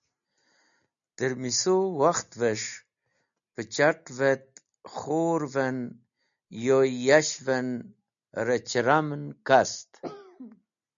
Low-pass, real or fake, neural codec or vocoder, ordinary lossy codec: 7.2 kHz; real; none; MP3, 96 kbps